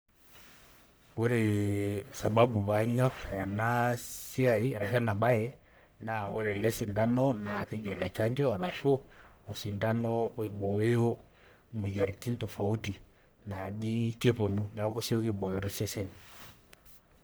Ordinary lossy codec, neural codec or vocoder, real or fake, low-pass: none; codec, 44.1 kHz, 1.7 kbps, Pupu-Codec; fake; none